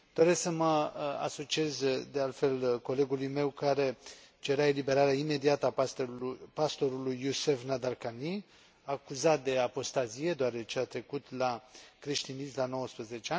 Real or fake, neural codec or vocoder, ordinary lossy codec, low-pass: real; none; none; none